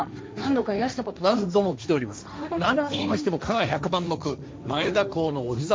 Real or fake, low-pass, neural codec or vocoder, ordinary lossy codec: fake; none; codec, 16 kHz, 1.1 kbps, Voila-Tokenizer; none